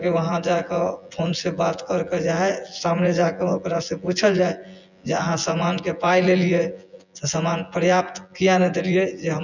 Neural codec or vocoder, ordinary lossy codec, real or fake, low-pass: vocoder, 24 kHz, 100 mel bands, Vocos; none; fake; 7.2 kHz